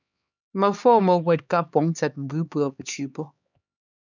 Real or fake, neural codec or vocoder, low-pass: fake; codec, 16 kHz, 2 kbps, X-Codec, HuBERT features, trained on LibriSpeech; 7.2 kHz